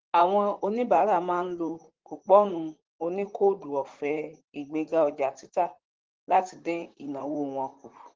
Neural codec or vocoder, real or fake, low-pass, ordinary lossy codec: vocoder, 44.1 kHz, 128 mel bands, Pupu-Vocoder; fake; 7.2 kHz; Opus, 16 kbps